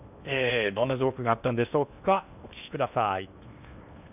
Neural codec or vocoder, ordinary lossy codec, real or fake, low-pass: codec, 16 kHz in and 24 kHz out, 0.8 kbps, FocalCodec, streaming, 65536 codes; none; fake; 3.6 kHz